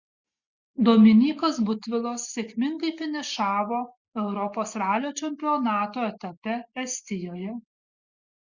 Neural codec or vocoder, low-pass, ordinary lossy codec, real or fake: none; 7.2 kHz; Opus, 64 kbps; real